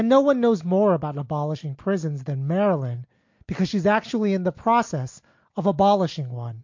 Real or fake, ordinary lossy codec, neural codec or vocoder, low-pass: real; MP3, 48 kbps; none; 7.2 kHz